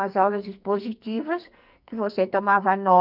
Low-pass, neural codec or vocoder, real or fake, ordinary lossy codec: 5.4 kHz; codec, 44.1 kHz, 2.6 kbps, SNAC; fake; none